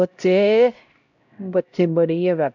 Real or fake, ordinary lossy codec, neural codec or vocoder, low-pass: fake; MP3, 64 kbps; codec, 16 kHz, 0.5 kbps, X-Codec, HuBERT features, trained on LibriSpeech; 7.2 kHz